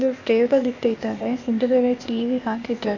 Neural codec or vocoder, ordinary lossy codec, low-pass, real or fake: codec, 16 kHz, 0.8 kbps, ZipCodec; none; 7.2 kHz; fake